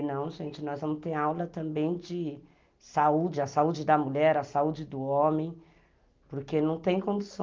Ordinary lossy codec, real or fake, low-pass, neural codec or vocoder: Opus, 32 kbps; real; 7.2 kHz; none